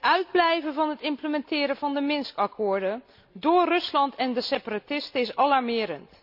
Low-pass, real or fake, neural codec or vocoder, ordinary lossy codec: 5.4 kHz; real; none; none